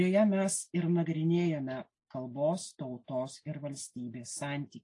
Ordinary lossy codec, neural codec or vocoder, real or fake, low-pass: AAC, 48 kbps; none; real; 10.8 kHz